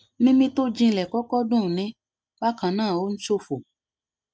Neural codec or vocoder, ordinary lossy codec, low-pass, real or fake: none; none; none; real